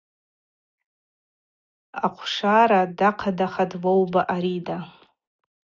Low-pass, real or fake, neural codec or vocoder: 7.2 kHz; real; none